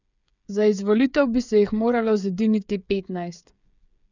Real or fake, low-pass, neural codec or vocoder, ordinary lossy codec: fake; 7.2 kHz; codec, 16 kHz, 8 kbps, FreqCodec, smaller model; none